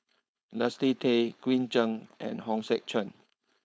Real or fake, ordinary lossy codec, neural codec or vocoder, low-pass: fake; none; codec, 16 kHz, 4.8 kbps, FACodec; none